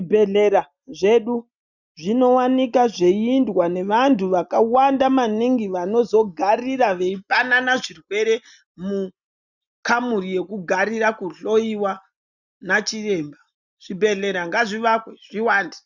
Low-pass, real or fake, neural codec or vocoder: 7.2 kHz; real; none